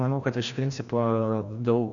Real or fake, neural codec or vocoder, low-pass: fake; codec, 16 kHz, 1 kbps, FreqCodec, larger model; 7.2 kHz